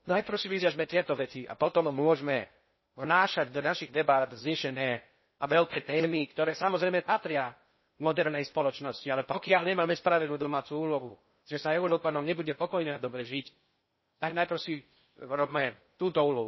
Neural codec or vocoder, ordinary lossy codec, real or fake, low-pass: codec, 16 kHz in and 24 kHz out, 0.6 kbps, FocalCodec, streaming, 2048 codes; MP3, 24 kbps; fake; 7.2 kHz